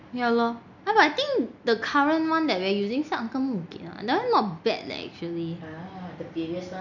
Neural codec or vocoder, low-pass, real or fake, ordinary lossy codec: none; 7.2 kHz; real; none